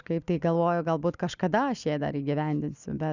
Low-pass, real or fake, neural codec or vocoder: 7.2 kHz; real; none